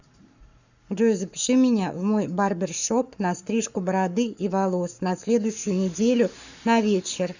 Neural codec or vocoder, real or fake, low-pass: codec, 44.1 kHz, 7.8 kbps, Pupu-Codec; fake; 7.2 kHz